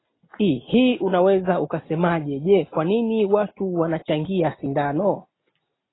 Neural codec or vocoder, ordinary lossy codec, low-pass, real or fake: none; AAC, 16 kbps; 7.2 kHz; real